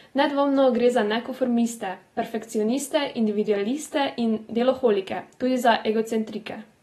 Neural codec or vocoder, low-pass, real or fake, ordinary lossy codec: none; 19.8 kHz; real; AAC, 32 kbps